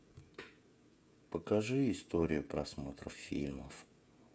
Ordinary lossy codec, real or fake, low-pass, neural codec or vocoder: none; fake; none; codec, 16 kHz, 16 kbps, FreqCodec, smaller model